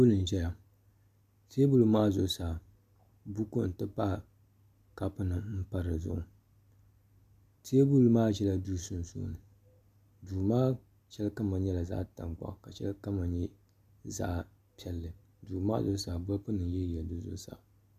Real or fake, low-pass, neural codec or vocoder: real; 14.4 kHz; none